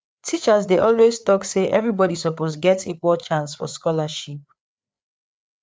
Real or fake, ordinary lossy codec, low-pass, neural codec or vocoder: fake; none; none; codec, 16 kHz, 4 kbps, FreqCodec, larger model